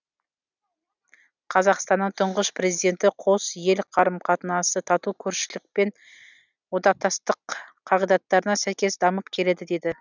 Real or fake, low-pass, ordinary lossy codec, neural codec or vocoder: real; 7.2 kHz; none; none